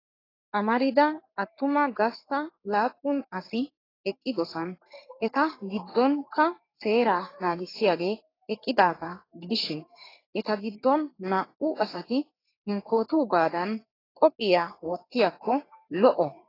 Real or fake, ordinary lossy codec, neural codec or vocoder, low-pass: fake; AAC, 24 kbps; codec, 44.1 kHz, 3.4 kbps, Pupu-Codec; 5.4 kHz